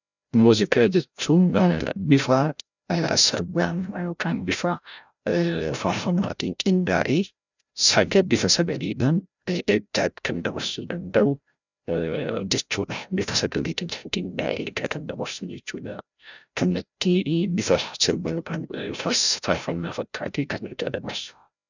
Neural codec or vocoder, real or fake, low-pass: codec, 16 kHz, 0.5 kbps, FreqCodec, larger model; fake; 7.2 kHz